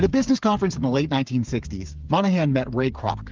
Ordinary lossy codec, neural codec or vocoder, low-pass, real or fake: Opus, 32 kbps; codec, 16 kHz, 8 kbps, FreqCodec, smaller model; 7.2 kHz; fake